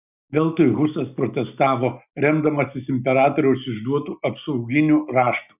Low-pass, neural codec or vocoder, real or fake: 3.6 kHz; none; real